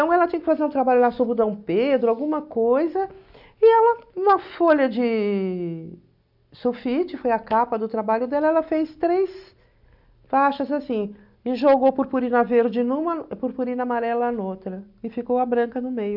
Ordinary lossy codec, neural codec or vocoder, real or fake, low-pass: none; none; real; 5.4 kHz